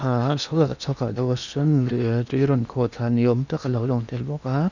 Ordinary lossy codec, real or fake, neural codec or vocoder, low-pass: none; fake; codec, 16 kHz in and 24 kHz out, 0.8 kbps, FocalCodec, streaming, 65536 codes; 7.2 kHz